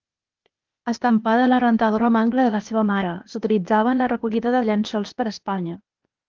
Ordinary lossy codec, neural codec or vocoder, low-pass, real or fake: Opus, 32 kbps; codec, 16 kHz, 0.8 kbps, ZipCodec; 7.2 kHz; fake